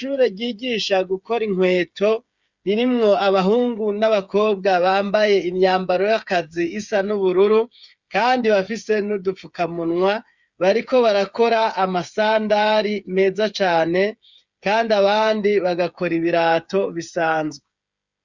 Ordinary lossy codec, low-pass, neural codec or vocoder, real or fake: Opus, 64 kbps; 7.2 kHz; codec, 16 kHz, 8 kbps, FreqCodec, smaller model; fake